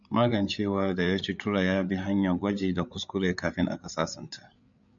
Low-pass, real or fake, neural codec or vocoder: 7.2 kHz; fake; codec, 16 kHz, 8 kbps, FreqCodec, larger model